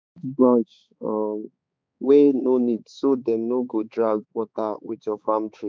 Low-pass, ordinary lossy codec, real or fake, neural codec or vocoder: none; none; fake; codec, 16 kHz, 4 kbps, X-Codec, HuBERT features, trained on balanced general audio